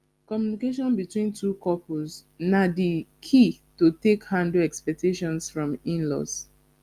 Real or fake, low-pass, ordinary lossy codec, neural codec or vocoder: real; 14.4 kHz; Opus, 32 kbps; none